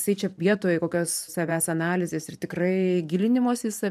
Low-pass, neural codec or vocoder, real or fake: 14.4 kHz; vocoder, 44.1 kHz, 128 mel bands every 512 samples, BigVGAN v2; fake